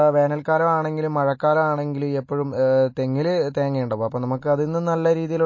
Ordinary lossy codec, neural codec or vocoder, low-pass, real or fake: MP3, 32 kbps; none; 7.2 kHz; real